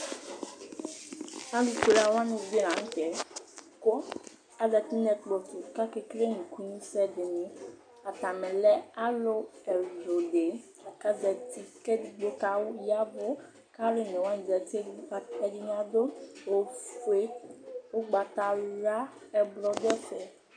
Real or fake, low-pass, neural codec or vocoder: real; 9.9 kHz; none